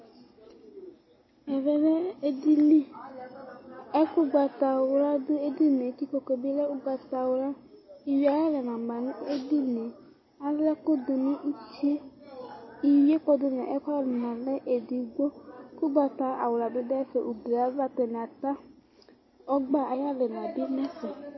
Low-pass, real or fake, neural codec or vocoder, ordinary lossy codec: 7.2 kHz; real; none; MP3, 24 kbps